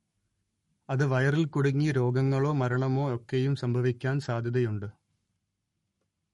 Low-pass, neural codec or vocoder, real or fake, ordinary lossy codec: 19.8 kHz; codec, 44.1 kHz, 7.8 kbps, Pupu-Codec; fake; MP3, 48 kbps